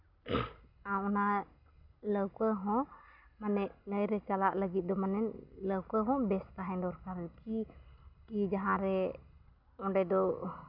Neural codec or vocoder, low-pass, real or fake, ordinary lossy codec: none; 5.4 kHz; real; none